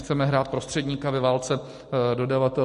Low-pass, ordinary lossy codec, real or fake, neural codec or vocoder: 14.4 kHz; MP3, 48 kbps; real; none